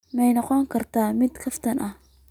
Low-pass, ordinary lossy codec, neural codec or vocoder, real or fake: 19.8 kHz; none; none; real